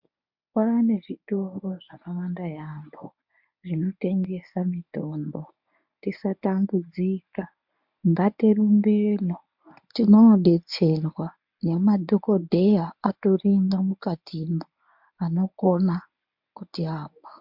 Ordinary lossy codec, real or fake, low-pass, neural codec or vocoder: MP3, 48 kbps; fake; 5.4 kHz; codec, 24 kHz, 0.9 kbps, WavTokenizer, medium speech release version 1